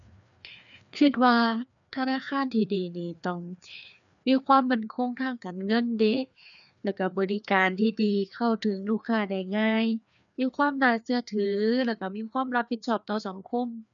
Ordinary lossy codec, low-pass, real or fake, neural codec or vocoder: none; 7.2 kHz; fake; codec, 16 kHz, 2 kbps, FreqCodec, larger model